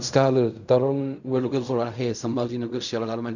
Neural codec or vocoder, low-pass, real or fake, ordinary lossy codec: codec, 16 kHz in and 24 kHz out, 0.4 kbps, LongCat-Audio-Codec, fine tuned four codebook decoder; 7.2 kHz; fake; none